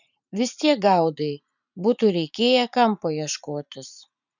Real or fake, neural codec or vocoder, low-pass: fake; vocoder, 44.1 kHz, 80 mel bands, Vocos; 7.2 kHz